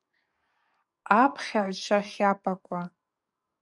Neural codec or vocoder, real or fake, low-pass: codec, 44.1 kHz, 7.8 kbps, DAC; fake; 10.8 kHz